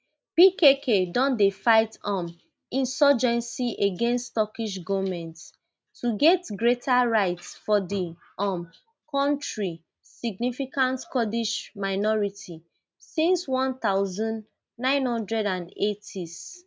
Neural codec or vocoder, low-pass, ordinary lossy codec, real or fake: none; none; none; real